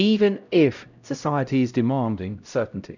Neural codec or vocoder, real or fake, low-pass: codec, 16 kHz, 0.5 kbps, X-Codec, HuBERT features, trained on LibriSpeech; fake; 7.2 kHz